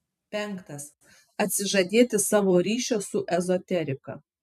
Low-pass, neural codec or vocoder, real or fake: 14.4 kHz; vocoder, 44.1 kHz, 128 mel bands every 512 samples, BigVGAN v2; fake